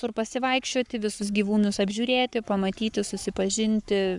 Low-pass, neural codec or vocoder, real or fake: 10.8 kHz; codec, 44.1 kHz, 7.8 kbps, Pupu-Codec; fake